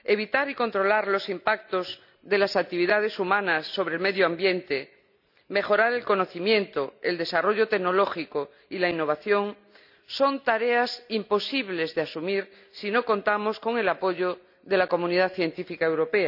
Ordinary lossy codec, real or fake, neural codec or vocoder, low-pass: none; real; none; 5.4 kHz